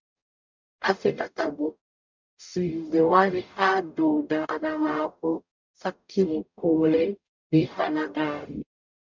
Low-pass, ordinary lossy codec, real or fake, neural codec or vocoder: 7.2 kHz; MP3, 64 kbps; fake; codec, 44.1 kHz, 0.9 kbps, DAC